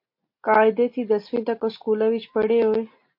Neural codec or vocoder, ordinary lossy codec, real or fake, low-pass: none; MP3, 32 kbps; real; 5.4 kHz